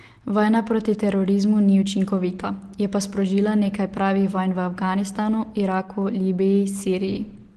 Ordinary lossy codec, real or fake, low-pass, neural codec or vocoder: Opus, 16 kbps; real; 10.8 kHz; none